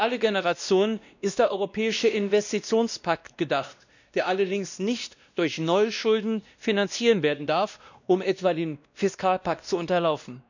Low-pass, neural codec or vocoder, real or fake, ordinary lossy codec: 7.2 kHz; codec, 16 kHz, 1 kbps, X-Codec, WavLM features, trained on Multilingual LibriSpeech; fake; none